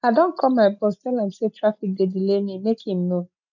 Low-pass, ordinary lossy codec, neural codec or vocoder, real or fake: 7.2 kHz; none; none; real